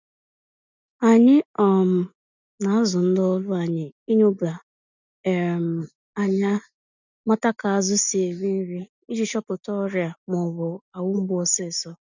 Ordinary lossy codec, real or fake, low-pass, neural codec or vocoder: none; real; 7.2 kHz; none